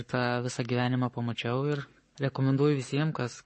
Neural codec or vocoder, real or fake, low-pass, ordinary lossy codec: codec, 44.1 kHz, 7.8 kbps, Pupu-Codec; fake; 10.8 kHz; MP3, 32 kbps